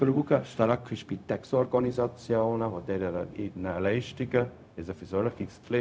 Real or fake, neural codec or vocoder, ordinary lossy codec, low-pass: fake; codec, 16 kHz, 0.4 kbps, LongCat-Audio-Codec; none; none